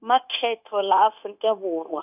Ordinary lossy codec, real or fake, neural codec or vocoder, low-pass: none; fake; codec, 16 kHz, 0.9 kbps, LongCat-Audio-Codec; 3.6 kHz